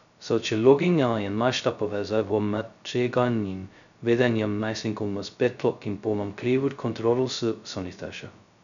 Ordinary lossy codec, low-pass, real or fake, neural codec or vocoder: none; 7.2 kHz; fake; codec, 16 kHz, 0.2 kbps, FocalCodec